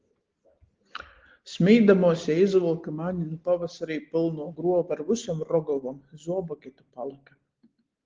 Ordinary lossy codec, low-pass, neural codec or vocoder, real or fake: Opus, 16 kbps; 7.2 kHz; none; real